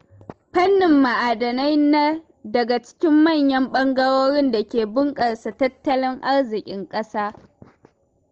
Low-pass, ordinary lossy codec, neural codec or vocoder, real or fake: 7.2 kHz; Opus, 16 kbps; none; real